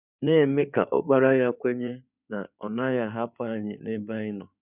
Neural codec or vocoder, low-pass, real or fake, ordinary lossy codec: codec, 16 kHz in and 24 kHz out, 2.2 kbps, FireRedTTS-2 codec; 3.6 kHz; fake; none